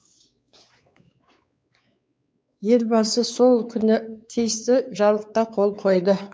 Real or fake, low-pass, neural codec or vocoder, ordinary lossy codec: fake; none; codec, 16 kHz, 4 kbps, X-Codec, WavLM features, trained on Multilingual LibriSpeech; none